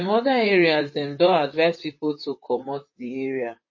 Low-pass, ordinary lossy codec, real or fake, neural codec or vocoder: 7.2 kHz; MP3, 32 kbps; fake; vocoder, 44.1 kHz, 128 mel bands, Pupu-Vocoder